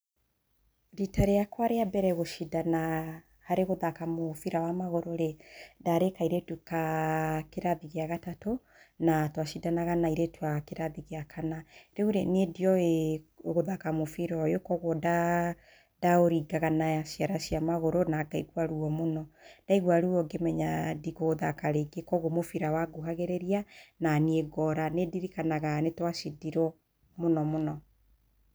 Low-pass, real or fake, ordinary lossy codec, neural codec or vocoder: none; real; none; none